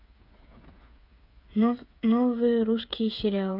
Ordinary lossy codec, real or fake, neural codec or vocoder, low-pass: none; fake; codec, 16 kHz, 8 kbps, FreqCodec, smaller model; 5.4 kHz